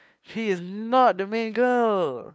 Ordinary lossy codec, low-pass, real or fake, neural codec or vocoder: none; none; fake; codec, 16 kHz, 2 kbps, FunCodec, trained on LibriTTS, 25 frames a second